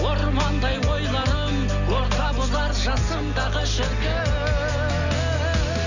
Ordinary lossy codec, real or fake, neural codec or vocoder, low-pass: none; real; none; 7.2 kHz